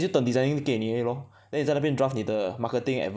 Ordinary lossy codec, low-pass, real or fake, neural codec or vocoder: none; none; real; none